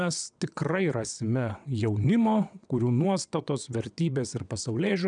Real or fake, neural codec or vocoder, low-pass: fake; vocoder, 22.05 kHz, 80 mel bands, WaveNeXt; 9.9 kHz